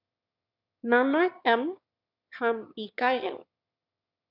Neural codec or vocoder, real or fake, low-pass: autoencoder, 22.05 kHz, a latent of 192 numbers a frame, VITS, trained on one speaker; fake; 5.4 kHz